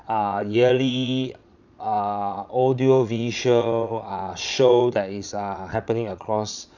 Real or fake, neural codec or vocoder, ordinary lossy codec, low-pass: fake; vocoder, 22.05 kHz, 80 mel bands, Vocos; none; 7.2 kHz